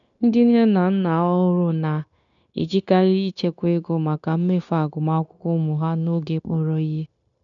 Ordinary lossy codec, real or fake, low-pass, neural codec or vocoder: none; fake; 7.2 kHz; codec, 16 kHz, 0.9 kbps, LongCat-Audio-Codec